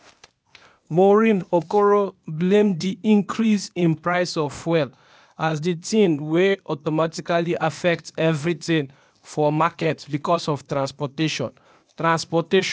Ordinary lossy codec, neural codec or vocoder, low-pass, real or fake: none; codec, 16 kHz, 0.8 kbps, ZipCodec; none; fake